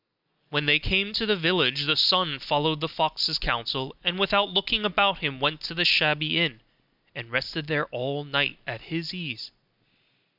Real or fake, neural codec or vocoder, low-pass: real; none; 5.4 kHz